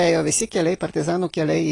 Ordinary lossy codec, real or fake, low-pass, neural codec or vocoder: AAC, 32 kbps; real; 10.8 kHz; none